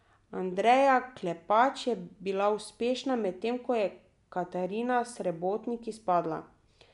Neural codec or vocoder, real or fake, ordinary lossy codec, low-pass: none; real; MP3, 96 kbps; 10.8 kHz